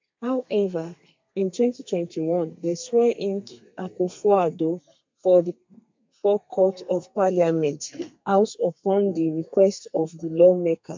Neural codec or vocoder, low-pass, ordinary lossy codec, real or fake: codec, 32 kHz, 1.9 kbps, SNAC; 7.2 kHz; MP3, 64 kbps; fake